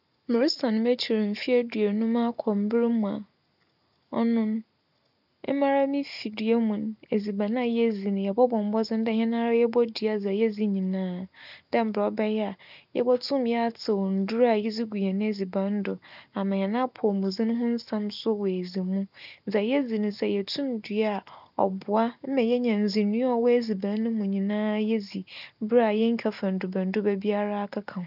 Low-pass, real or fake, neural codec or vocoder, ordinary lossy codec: 5.4 kHz; real; none; none